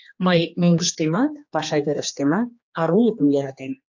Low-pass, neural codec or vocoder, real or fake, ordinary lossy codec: 7.2 kHz; codec, 16 kHz, 2 kbps, X-Codec, HuBERT features, trained on general audio; fake; AAC, 48 kbps